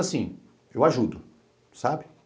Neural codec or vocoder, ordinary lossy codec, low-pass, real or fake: none; none; none; real